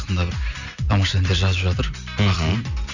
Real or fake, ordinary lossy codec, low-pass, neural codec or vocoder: real; none; 7.2 kHz; none